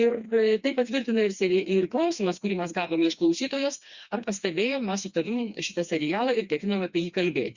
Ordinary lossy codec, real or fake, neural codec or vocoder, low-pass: Opus, 64 kbps; fake; codec, 16 kHz, 2 kbps, FreqCodec, smaller model; 7.2 kHz